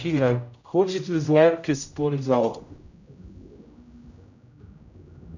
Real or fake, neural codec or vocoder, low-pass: fake; codec, 16 kHz, 0.5 kbps, X-Codec, HuBERT features, trained on general audio; 7.2 kHz